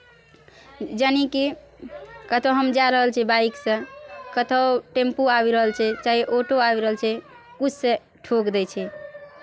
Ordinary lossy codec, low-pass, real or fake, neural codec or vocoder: none; none; real; none